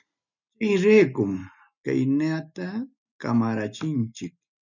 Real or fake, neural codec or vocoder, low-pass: real; none; 7.2 kHz